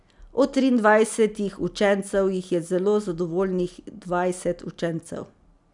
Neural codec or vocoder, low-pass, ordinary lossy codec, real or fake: none; 10.8 kHz; none; real